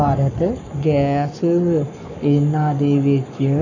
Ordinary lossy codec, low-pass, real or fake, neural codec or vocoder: none; 7.2 kHz; fake; codec, 44.1 kHz, 7.8 kbps, Pupu-Codec